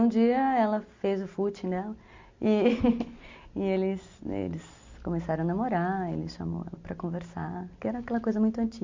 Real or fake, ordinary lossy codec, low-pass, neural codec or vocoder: real; MP3, 48 kbps; 7.2 kHz; none